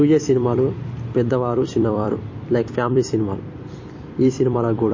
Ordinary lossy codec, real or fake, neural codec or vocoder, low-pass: MP3, 32 kbps; fake; vocoder, 44.1 kHz, 128 mel bands every 256 samples, BigVGAN v2; 7.2 kHz